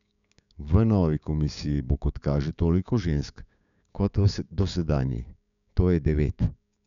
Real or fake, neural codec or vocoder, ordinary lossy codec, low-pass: fake; codec, 16 kHz, 6 kbps, DAC; MP3, 96 kbps; 7.2 kHz